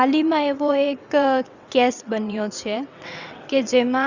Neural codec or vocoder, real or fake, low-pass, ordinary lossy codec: vocoder, 22.05 kHz, 80 mel bands, WaveNeXt; fake; 7.2 kHz; Opus, 64 kbps